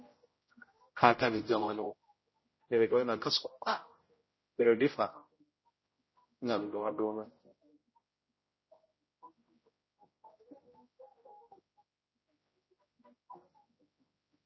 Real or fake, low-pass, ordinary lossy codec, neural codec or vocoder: fake; 7.2 kHz; MP3, 24 kbps; codec, 16 kHz, 0.5 kbps, X-Codec, HuBERT features, trained on general audio